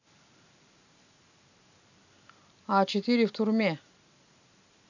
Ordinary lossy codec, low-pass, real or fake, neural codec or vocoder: none; 7.2 kHz; real; none